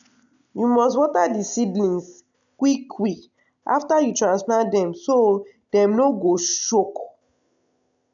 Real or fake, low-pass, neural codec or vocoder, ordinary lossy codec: real; 7.2 kHz; none; none